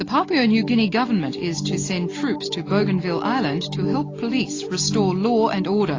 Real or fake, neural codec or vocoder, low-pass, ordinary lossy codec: real; none; 7.2 kHz; AAC, 32 kbps